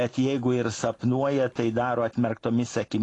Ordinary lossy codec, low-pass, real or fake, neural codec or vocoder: AAC, 32 kbps; 10.8 kHz; real; none